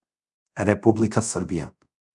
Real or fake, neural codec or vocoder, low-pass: fake; codec, 24 kHz, 0.5 kbps, DualCodec; 10.8 kHz